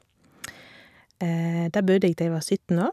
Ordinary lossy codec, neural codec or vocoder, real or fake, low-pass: none; vocoder, 44.1 kHz, 128 mel bands every 256 samples, BigVGAN v2; fake; 14.4 kHz